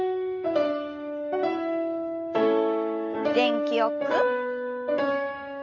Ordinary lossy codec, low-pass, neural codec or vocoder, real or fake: none; 7.2 kHz; autoencoder, 48 kHz, 128 numbers a frame, DAC-VAE, trained on Japanese speech; fake